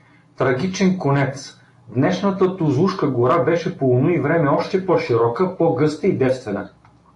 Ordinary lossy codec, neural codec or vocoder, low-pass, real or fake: AAC, 48 kbps; none; 10.8 kHz; real